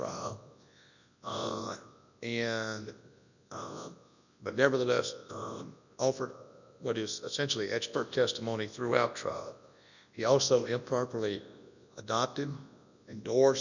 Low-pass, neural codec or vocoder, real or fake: 7.2 kHz; codec, 24 kHz, 0.9 kbps, WavTokenizer, large speech release; fake